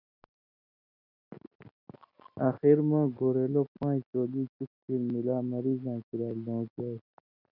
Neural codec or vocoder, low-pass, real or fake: none; 5.4 kHz; real